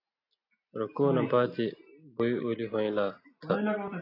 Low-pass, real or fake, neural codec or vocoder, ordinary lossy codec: 5.4 kHz; real; none; AAC, 32 kbps